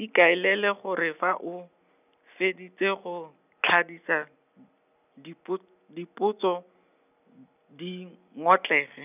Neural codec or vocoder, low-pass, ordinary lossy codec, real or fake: none; 3.6 kHz; none; real